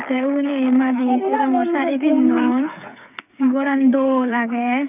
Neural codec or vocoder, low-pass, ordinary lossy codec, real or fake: codec, 16 kHz, 8 kbps, FreqCodec, smaller model; 3.6 kHz; none; fake